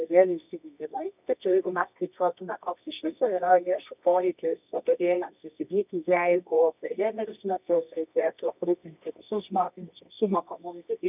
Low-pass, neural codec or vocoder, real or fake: 3.6 kHz; codec, 24 kHz, 0.9 kbps, WavTokenizer, medium music audio release; fake